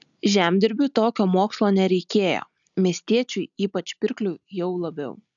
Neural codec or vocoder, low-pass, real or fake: none; 7.2 kHz; real